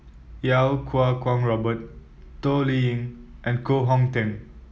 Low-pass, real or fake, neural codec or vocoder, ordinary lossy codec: none; real; none; none